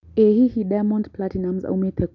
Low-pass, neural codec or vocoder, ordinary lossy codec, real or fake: 7.2 kHz; none; none; real